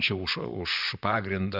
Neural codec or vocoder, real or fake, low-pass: vocoder, 44.1 kHz, 128 mel bands, Pupu-Vocoder; fake; 5.4 kHz